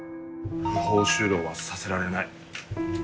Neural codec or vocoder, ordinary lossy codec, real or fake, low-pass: none; none; real; none